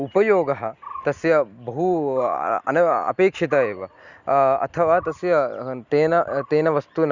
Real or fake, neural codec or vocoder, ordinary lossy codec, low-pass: real; none; Opus, 64 kbps; 7.2 kHz